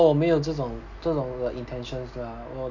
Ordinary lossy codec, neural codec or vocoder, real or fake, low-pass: none; none; real; 7.2 kHz